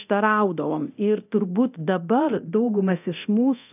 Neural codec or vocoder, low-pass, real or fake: codec, 24 kHz, 0.9 kbps, DualCodec; 3.6 kHz; fake